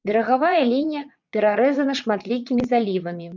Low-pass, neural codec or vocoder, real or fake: 7.2 kHz; vocoder, 22.05 kHz, 80 mel bands, WaveNeXt; fake